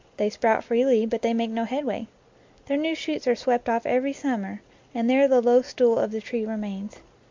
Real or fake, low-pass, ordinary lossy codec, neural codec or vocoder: real; 7.2 kHz; MP3, 64 kbps; none